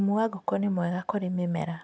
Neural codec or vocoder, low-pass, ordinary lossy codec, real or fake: none; none; none; real